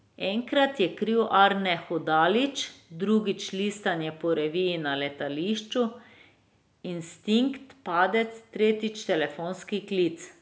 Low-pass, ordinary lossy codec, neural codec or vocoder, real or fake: none; none; none; real